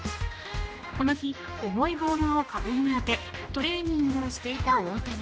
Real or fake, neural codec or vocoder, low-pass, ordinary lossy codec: fake; codec, 16 kHz, 1 kbps, X-Codec, HuBERT features, trained on general audio; none; none